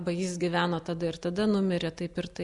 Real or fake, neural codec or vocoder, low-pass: real; none; 10.8 kHz